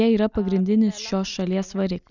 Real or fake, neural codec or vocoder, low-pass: real; none; 7.2 kHz